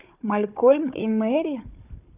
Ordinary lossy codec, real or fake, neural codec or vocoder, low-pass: none; fake; codec, 16 kHz, 4 kbps, FunCodec, trained on Chinese and English, 50 frames a second; 3.6 kHz